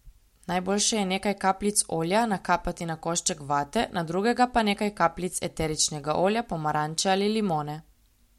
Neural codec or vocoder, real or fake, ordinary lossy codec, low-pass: none; real; MP3, 64 kbps; 19.8 kHz